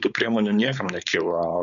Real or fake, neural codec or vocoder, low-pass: fake; codec, 16 kHz, 4.8 kbps, FACodec; 7.2 kHz